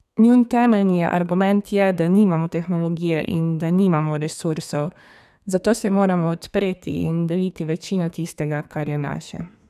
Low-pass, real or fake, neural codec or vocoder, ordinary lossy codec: 14.4 kHz; fake; codec, 32 kHz, 1.9 kbps, SNAC; none